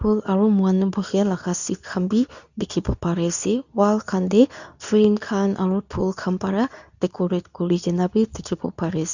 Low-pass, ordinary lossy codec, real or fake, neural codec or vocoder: 7.2 kHz; none; fake; codec, 24 kHz, 0.9 kbps, WavTokenizer, medium speech release version 2